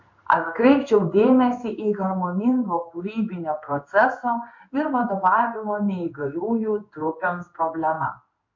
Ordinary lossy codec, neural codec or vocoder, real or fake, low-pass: MP3, 48 kbps; codec, 16 kHz in and 24 kHz out, 1 kbps, XY-Tokenizer; fake; 7.2 kHz